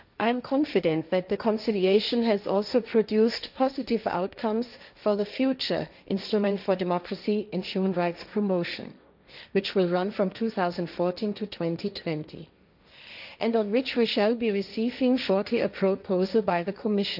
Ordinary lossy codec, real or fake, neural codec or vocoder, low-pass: none; fake; codec, 16 kHz, 1.1 kbps, Voila-Tokenizer; 5.4 kHz